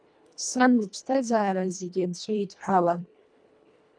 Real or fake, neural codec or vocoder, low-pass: fake; codec, 24 kHz, 1.5 kbps, HILCodec; 9.9 kHz